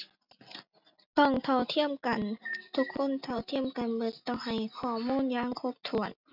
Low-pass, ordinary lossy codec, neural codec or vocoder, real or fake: 5.4 kHz; none; none; real